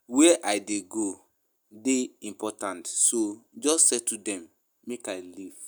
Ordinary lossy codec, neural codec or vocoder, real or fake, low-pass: none; none; real; none